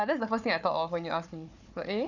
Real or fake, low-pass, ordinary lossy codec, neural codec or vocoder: fake; 7.2 kHz; none; codec, 16 kHz, 4 kbps, FunCodec, trained on Chinese and English, 50 frames a second